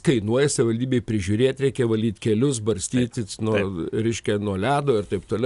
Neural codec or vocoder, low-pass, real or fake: none; 10.8 kHz; real